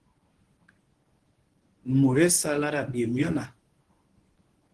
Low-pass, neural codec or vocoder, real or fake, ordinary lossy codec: 10.8 kHz; codec, 24 kHz, 0.9 kbps, WavTokenizer, medium speech release version 1; fake; Opus, 16 kbps